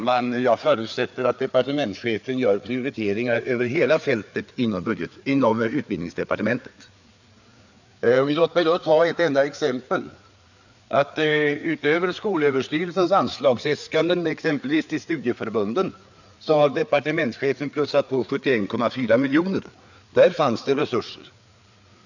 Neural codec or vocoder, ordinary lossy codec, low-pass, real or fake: codec, 16 kHz, 4 kbps, FreqCodec, larger model; none; 7.2 kHz; fake